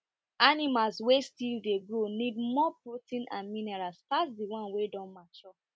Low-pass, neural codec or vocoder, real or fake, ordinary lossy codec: none; none; real; none